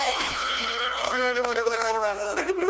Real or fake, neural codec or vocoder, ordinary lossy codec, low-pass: fake; codec, 16 kHz, 1 kbps, FunCodec, trained on LibriTTS, 50 frames a second; none; none